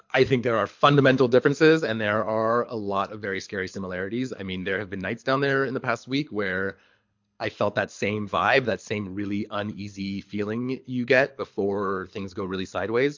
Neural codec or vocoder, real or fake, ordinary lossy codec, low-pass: codec, 24 kHz, 6 kbps, HILCodec; fake; MP3, 48 kbps; 7.2 kHz